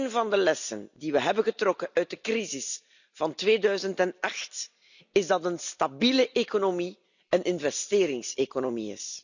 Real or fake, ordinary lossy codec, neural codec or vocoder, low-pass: real; none; none; 7.2 kHz